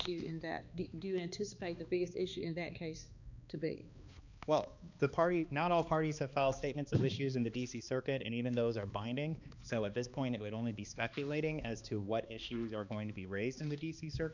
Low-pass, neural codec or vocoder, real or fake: 7.2 kHz; codec, 16 kHz, 2 kbps, X-Codec, HuBERT features, trained on balanced general audio; fake